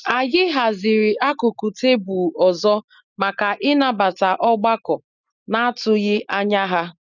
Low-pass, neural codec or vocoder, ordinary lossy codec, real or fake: 7.2 kHz; none; none; real